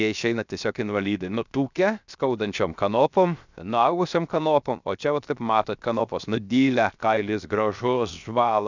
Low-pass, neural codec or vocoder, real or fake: 7.2 kHz; codec, 16 kHz, 0.7 kbps, FocalCodec; fake